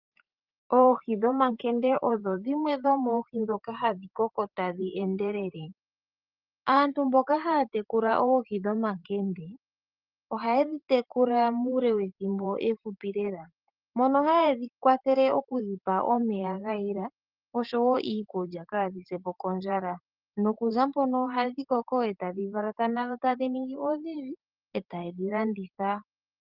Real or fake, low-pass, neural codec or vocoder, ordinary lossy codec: fake; 5.4 kHz; vocoder, 44.1 kHz, 128 mel bands every 512 samples, BigVGAN v2; Opus, 32 kbps